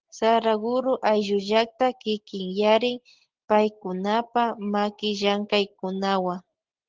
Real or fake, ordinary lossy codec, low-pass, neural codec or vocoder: real; Opus, 16 kbps; 7.2 kHz; none